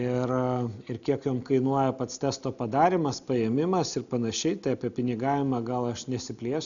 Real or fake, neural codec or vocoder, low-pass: real; none; 7.2 kHz